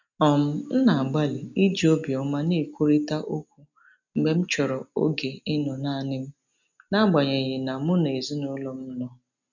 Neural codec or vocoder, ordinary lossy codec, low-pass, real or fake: none; none; 7.2 kHz; real